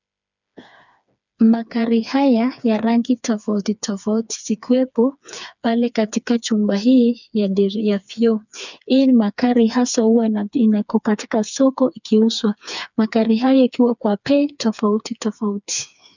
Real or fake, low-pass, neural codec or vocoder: fake; 7.2 kHz; codec, 16 kHz, 4 kbps, FreqCodec, smaller model